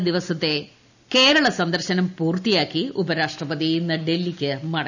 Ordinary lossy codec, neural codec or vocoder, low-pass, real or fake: none; none; 7.2 kHz; real